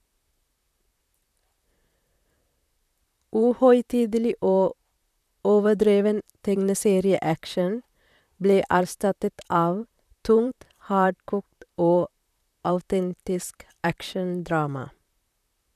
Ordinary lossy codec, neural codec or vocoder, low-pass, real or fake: none; vocoder, 44.1 kHz, 128 mel bands, Pupu-Vocoder; 14.4 kHz; fake